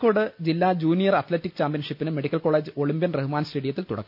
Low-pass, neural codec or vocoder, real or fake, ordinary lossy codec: 5.4 kHz; none; real; none